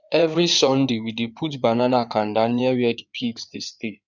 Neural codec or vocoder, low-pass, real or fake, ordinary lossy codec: codec, 16 kHz in and 24 kHz out, 2.2 kbps, FireRedTTS-2 codec; 7.2 kHz; fake; none